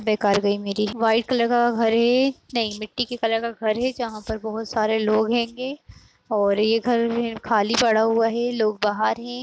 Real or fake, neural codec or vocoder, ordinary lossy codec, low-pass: real; none; none; none